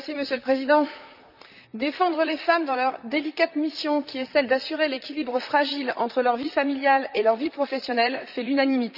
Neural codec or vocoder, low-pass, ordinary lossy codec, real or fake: vocoder, 44.1 kHz, 128 mel bands, Pupu-Vocoder; 5.4 kHz; none; fake